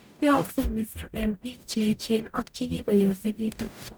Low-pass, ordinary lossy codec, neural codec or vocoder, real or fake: none; none; codec, 44.1 kHz, 0.9 kbps, DAC; fake